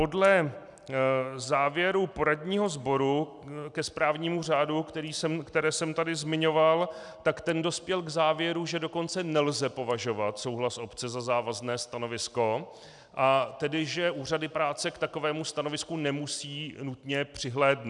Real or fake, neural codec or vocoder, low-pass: real; none; 10.8 kHz